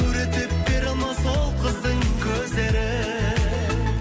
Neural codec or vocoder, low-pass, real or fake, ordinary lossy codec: none; none; real; none